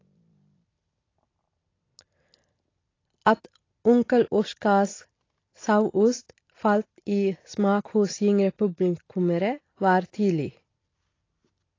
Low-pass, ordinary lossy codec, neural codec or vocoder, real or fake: 7.2 kHz; AAC, 32 kbps; none; real